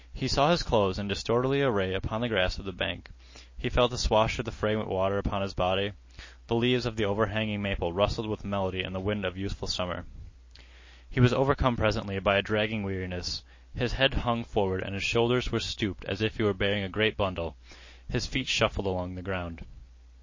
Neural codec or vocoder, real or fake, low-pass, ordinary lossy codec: none; real; 7.2 kHz; MP3, 32 kbps